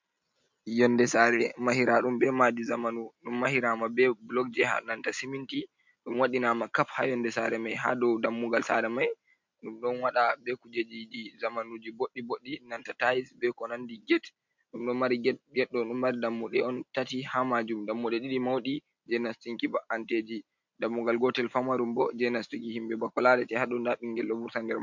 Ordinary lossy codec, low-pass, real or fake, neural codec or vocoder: MP3, 64 kbps; 7.2 kHz; real; none